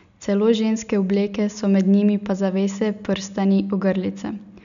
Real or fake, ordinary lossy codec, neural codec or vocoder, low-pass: real; none; none; 7.2 kHz